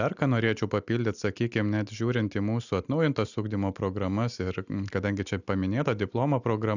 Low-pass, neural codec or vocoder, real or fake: 7.2 kHz; none; real